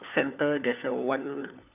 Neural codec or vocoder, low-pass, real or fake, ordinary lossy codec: codec, 16 kHz, 4 kbps, FunCodec, trained on LibriTTS, 50 frames a second; 3.6 kHz; fake; none